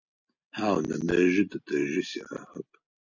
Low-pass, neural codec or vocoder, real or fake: 7.2 kHz; none; real